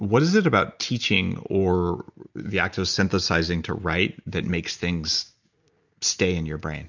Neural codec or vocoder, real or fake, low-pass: none; real; 7.2 kHz